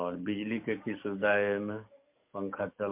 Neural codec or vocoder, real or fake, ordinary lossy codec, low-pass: codec, 44.1 kHz, 7.8 kbps, DAC; fake; MP3, 32 kbps; 3.6 kHz